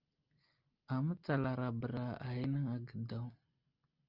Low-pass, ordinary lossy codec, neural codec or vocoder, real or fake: 5.4 kHz; Opus, 32 kbps; none; real